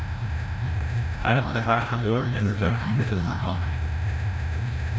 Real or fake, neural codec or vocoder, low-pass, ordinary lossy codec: fake; codec, 16 kHz, 0.5 kbps, FreqCodec, larger model; none; none